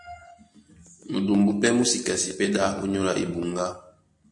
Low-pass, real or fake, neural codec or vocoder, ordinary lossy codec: 10.8 kHz; real; none; MP3, 48 kbps